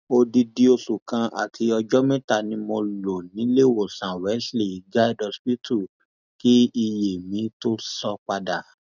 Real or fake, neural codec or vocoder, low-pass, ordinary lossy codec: real; none; 7.2 kHz; none